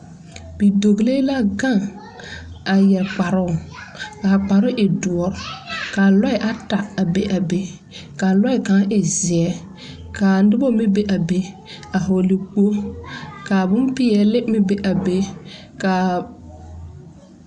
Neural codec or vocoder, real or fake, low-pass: none; real; 9.9 kHz